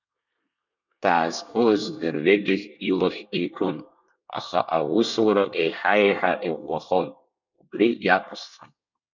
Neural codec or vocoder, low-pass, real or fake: codec, 24 kHz, 1 kbps, SNAC; 7.2 kHz; fake